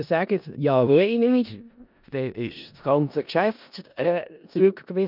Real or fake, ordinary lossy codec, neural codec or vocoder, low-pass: fake; none; codec, 16 kHz in and 24 kHz out, 0.4 kbps, LongCat-Audio-Codec, four codebook decoder; 5.4 kHz